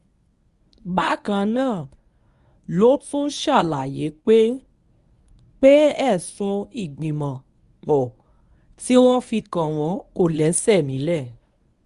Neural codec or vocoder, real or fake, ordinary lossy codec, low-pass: codec, 24 kHz, 0.9 kbps, WavTokenizer, medium speech release version 1; fake; Opus, 64 kbps; 10.8 kHz